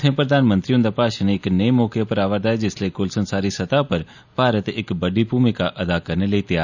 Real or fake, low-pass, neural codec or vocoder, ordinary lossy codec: real; 7.2 kHz; none; none